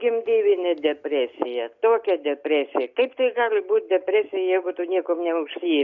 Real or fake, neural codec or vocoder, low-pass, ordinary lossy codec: real; none; 7.2 kHz; MP3, 64 kbps